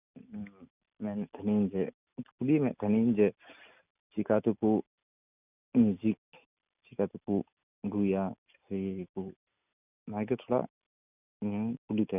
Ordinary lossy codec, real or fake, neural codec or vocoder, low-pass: none; real; none; 3.6 kHz